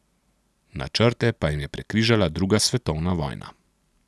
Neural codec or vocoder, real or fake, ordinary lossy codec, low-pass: none; real; none; none